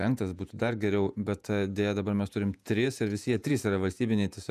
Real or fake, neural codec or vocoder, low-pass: fake; vocoder, 48 kHz, 128 mel bands, Vocos; 14.4 kHz